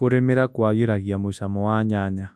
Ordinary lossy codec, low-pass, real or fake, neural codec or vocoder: none; none; fake; codec, 24 kHz, 0.9 kbps, WavTokenizer, large speech release